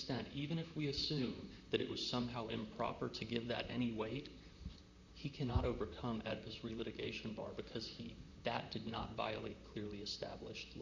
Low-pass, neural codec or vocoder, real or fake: 7.2 kHz; vocoder, 44.1 kHz, 128 mel bands, Pupu-Vocoder; fake